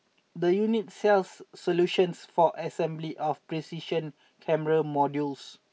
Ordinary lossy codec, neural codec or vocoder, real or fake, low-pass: none; none; real; none